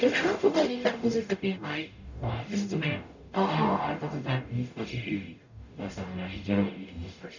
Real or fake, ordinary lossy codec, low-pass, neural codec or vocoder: fake; none; 7.2 kHz; codec, 44.1 kHz, 0.9 kbps, DAC